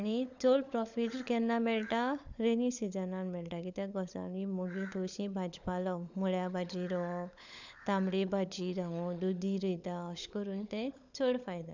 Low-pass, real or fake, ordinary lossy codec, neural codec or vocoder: 7.2 kHz; fake; none; codec, 16 kHz, 16 kbps, FunCodec, trained on LibriTTS, 50 frames a second